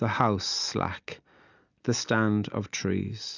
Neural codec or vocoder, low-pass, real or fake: none; 7.2 kHz; real